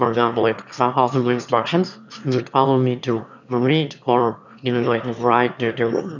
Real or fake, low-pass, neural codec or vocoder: fake; 7.2 kHz; autoencoder, 22.05 kHz, a latent of 192 numbers a frame, VITS, trained on one speaker